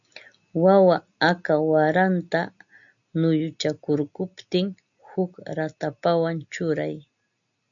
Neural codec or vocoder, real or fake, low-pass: none; real; 7.2 kHz